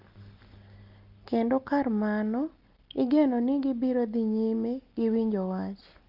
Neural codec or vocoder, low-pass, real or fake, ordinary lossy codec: none; 5.4 kHz; real; Opus, 32 kbps